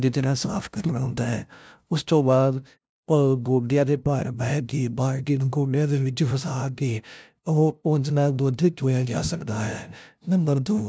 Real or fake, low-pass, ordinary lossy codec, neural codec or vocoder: fake; none; none; codec, 16 kHz, 0.5 kbps, FunCodec, trained on LibriTTS, 25 frames a second